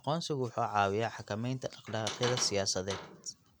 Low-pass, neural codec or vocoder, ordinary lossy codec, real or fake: none; none; none; real